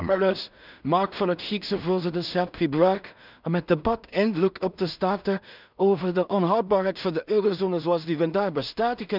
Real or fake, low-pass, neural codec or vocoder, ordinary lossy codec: fake; 5.4 kHz; codec, 16 kHz in and 24 kHz out, 0.4 kbps, LongCat-Audio-Codec, two codebook decoder; none